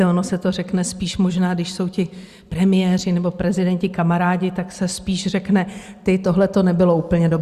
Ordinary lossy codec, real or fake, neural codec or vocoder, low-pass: Opus, 64 kbps; real; none; 14.4 kHz